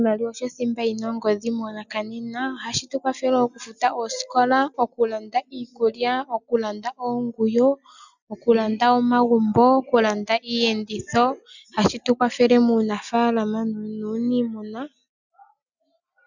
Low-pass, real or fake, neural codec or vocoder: 7.2 kHz; real; none